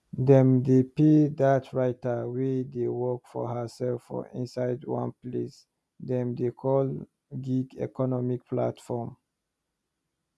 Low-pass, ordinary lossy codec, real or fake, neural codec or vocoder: none; none; real; none